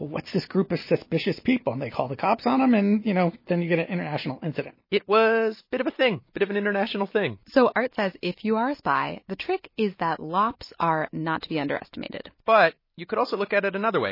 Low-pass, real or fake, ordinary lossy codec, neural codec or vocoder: 5.4 kHz; real; MP3, 24 kbps; none